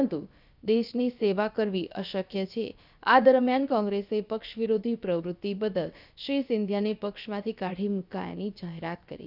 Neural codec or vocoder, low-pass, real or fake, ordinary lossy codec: codec, 16 kHz, about 1 kbps, DyCAST, with the encoder's durations; 5.4 kHz; fake; none